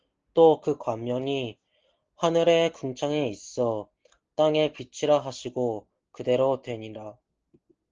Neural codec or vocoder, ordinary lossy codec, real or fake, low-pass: none; Opus, 16 kbps; real; 7.2 kHz